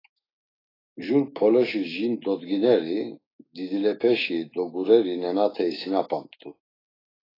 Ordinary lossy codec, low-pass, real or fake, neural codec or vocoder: AAC, 24 kbps; 5.4 kHz; fake; autoencoder, 48 kHz, 128 numbers a frame, DAC-VAE, trained on Japanese speech